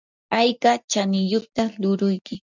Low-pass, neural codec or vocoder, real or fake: 7.2 kHz; none; real